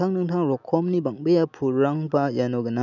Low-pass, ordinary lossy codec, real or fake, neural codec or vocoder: 7.2 kHz; none; real; none